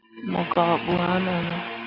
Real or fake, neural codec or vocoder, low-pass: real; none; 5.4 kHz